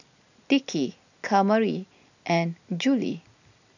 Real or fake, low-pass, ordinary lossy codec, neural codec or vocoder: real; 7.2 kHz; none; none